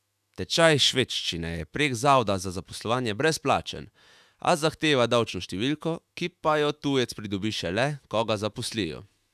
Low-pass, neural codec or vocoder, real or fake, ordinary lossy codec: 14.4 kHz; autoencoder, 48 kHz, 128 numbers a frame, DAC-VAE, trained on Japanese speech; fake; none